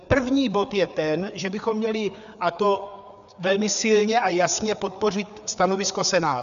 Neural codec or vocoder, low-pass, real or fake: codec, 16 kHz, 4 kbps, FreqCodec, larger model; 7.2 kHz; fake